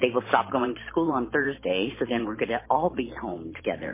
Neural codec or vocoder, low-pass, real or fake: vocoder, 44.1 kHz, 128 mel bands every 512 samples, BigVGAN v2; 3.6 kHz; fake